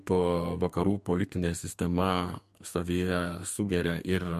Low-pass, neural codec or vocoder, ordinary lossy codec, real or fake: 14.4 kHz; codec, 32 kHz, 1.9 kbps, SNAC; MP3, 64 kbps; fake